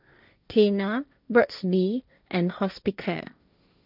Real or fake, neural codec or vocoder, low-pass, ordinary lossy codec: fake; codec, 16 kHz, 1.1 kbps, Voila-Tokenizer; 5.4 kHz; none